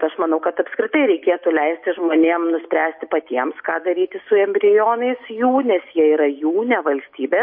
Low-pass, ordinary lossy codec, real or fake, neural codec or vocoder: 5.4 kHz; MP3, 32 kbps; real; none